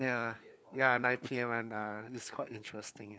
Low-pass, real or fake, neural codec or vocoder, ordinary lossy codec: none; fake; codec, 16 kHz, 4 kbps, FunCodec, trained on LibriTTS, 50 frames a second; none